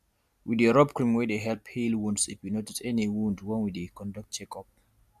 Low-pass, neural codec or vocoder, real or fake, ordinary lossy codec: 14.4 kHz; none; real; MP3, 96 kbps